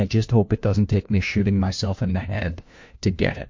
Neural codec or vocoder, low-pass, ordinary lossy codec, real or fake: codec, 16 kHz, 1 kbps, FunCodec, trained on LibriTTS, 50 frames a second; 7.2 kHz; MP3, 48 kbps; fake